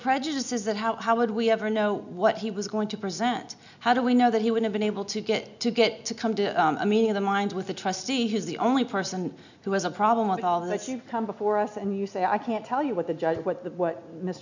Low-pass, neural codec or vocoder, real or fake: 7.2 kHz; none; real